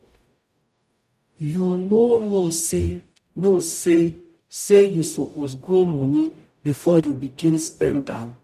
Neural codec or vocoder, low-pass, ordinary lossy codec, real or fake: codec, 44.1 kHz, 0.9 kbps, DAC; 14.4 kHz; none; fake